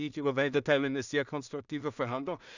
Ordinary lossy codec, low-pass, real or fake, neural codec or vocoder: none; 7.2 kHz; fake; codec, 16 kHz in and 24 kHz out, 0.4 kbps, LongCat-Audio-Codec, two codebook decoder